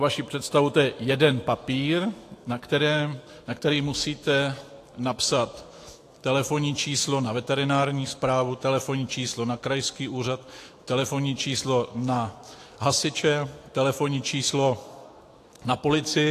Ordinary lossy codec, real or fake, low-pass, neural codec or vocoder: AAC, 48 kbps; fake; 14.4 kHz; autoencoder, 48 kHz, 128 numbers a frame, DAC-VAE, trained on Japanese speech